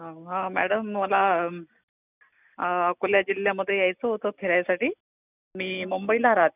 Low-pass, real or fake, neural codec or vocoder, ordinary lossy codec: 3.6 kHz; real; none; none